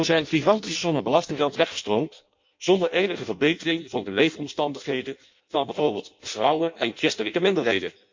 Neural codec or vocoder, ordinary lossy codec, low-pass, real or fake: codec, 16 kHz in and 24 kHz out, 0.6 kbps, FireRedTTS-2 codec; MP3, 48 kbps; 7.2 kHz; fake